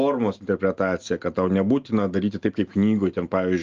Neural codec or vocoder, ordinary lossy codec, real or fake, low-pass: none; Opus, 32 kbps; real; 7.2 kHz